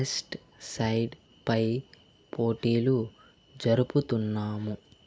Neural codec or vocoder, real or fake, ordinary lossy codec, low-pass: none; real; none; none